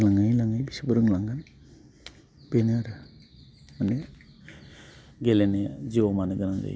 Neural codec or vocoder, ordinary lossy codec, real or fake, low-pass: none; none; real; none